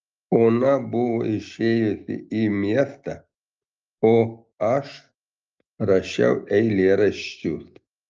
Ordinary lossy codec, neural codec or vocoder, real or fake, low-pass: Opus, 24 kbps; none; real; 7.2 kHz